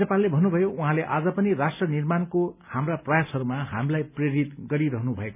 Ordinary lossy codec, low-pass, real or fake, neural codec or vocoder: none; 3.6 kHz; real; none